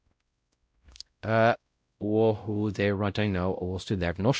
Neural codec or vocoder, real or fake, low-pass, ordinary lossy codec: codec, 16 kHz, 0.5 kbps, X-Codec, WavLM features, trained on Multilingual LibriSpeech; fake; none; none